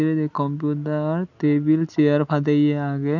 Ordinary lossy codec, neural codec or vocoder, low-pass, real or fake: none; none; 7.2 kHz; real